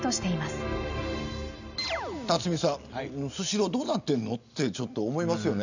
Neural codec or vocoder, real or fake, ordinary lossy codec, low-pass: none; real; none; 7.2 kHz